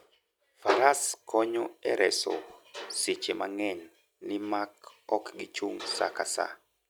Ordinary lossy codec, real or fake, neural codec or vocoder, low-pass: none; real; none; none